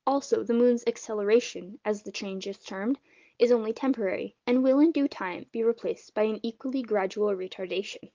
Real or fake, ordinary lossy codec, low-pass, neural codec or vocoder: real; Opus, 32 kbps; 7.2 kHz; none